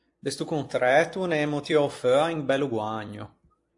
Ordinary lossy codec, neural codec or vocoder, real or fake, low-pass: AAC, 48 kbps; vocoder, 44.1 kHz, 128 mel bands every 256 samples, BigVGAN v2; fake; 10.8 kHz